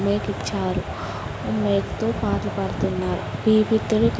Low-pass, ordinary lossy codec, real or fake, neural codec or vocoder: none; none; real; none